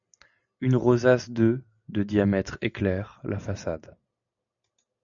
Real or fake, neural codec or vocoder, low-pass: real; none; 7.2 kHz